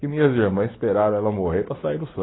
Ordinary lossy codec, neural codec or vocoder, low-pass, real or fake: AAC, 16 kbps; none; 7.2 kHz; real